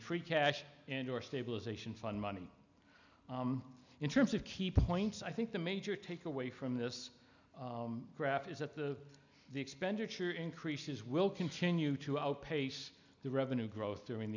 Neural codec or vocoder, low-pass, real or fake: none; 7.2 kHz; real